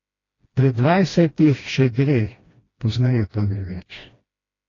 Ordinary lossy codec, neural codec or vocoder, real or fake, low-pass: AAC, 32 kbps; codec, 16 kHz, 1 kbps, FreqCodec, smaller model; fake; 7.2 kHz